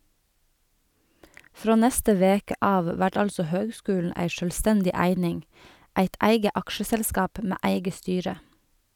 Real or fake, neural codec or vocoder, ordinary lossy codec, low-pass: real; none; none; 19.8 kHz